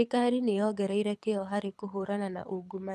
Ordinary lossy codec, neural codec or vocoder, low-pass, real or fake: none; codec, 24 kHz, 6 kbps, HILCodec; none; fake